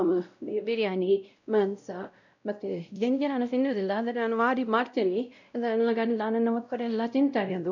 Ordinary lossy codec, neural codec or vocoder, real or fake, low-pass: none; codec, 16 kHz, 0.5 kbps, X-Codec, WavLM features, trained on Multilingual LibriSpeech; fake; 7.2 kHz